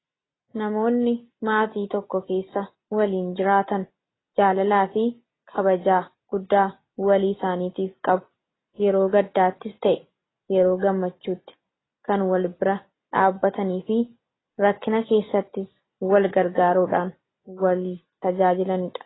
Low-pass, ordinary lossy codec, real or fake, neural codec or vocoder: 7.2 kHz; AAC, 16 kbps; real; none